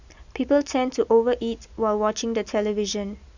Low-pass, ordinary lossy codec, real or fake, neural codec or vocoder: 7.2 kHz; none; real; none